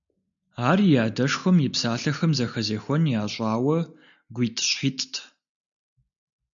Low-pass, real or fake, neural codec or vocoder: 7.2 kHz; real; none